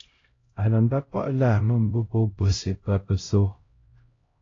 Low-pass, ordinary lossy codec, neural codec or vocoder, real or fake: 7.2 kHz; AAC, 32 kbps; codec, 16 kHz, 0.5 kbps, X-Codec, HuBERT features, trained on LibriSpeech; fake